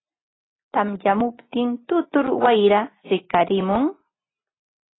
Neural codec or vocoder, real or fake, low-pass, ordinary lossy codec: none; real; 7.2 kHz; AAC, 16 kbps